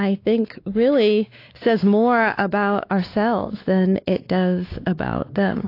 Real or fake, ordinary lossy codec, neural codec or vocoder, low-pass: fake; AAC, 32 kbps; codec, 16 kHz, 2 kbps, X-Codec, WavLM features, trained on Multilingual LibriSpeech; 5.4 kHz